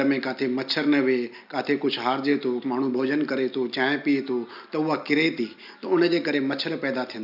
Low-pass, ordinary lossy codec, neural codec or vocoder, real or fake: 5.4 kHz; none; none; real